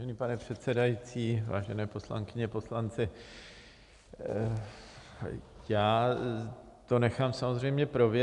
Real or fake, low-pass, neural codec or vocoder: real; 10.8 kHz; none